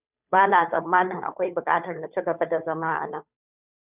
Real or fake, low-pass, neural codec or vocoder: fake; 3.6 kHz; codec, 16 kHz, 2 kbps, FunCodec, trained on Chinese and English, 25 frames a second